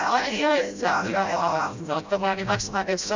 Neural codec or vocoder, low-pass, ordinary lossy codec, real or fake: codec, 16 kHz, 0.5 kbps, FreqCodec, smaller model; 7.2 kHz; MP3, 64 kbps; fake